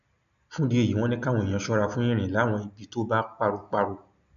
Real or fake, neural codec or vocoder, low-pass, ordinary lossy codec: real; none; 7.2 kHz; none